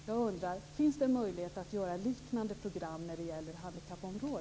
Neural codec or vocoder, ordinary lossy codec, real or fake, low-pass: none; none; real; none